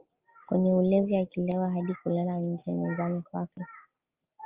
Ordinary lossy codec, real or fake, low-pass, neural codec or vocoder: Opus, 24 kbps; real; 3.6 kHz; none